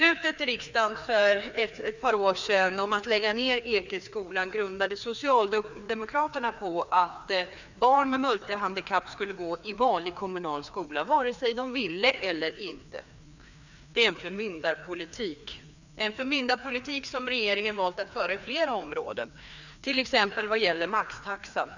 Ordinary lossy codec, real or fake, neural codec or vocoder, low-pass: none; fake; codec, 16 kHz, 2 kbps, FreqCodec, larger model; 7.2 kHz